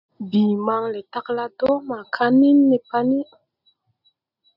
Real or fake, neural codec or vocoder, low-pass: real; none; 5.4 kHz